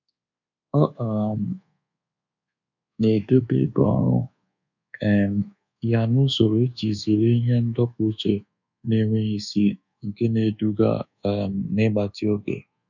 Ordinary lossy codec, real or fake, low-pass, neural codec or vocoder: none; fake; 7.2 kHz; codec, 24 kHz, 1.2 kbps, DualCodec